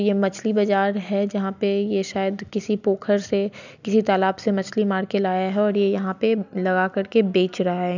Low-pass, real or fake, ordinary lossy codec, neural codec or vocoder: 7.2 kHz; real; none; none